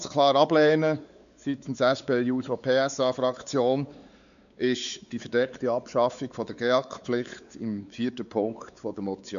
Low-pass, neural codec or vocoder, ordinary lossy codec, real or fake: 7.2 kHz; codec, 16 kHz, 4 kbps, X-Codec, WavLM features, trained on Multilingual LibriSpeech; none; fake